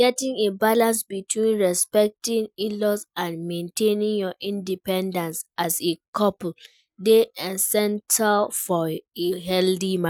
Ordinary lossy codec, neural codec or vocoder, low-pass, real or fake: none; none; 19.8 kHz; real